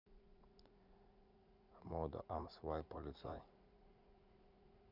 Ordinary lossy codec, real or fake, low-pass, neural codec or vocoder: none; fake; 5.4 kHz; vocoder, 44.1 kHz, 80 mel bands, Vocos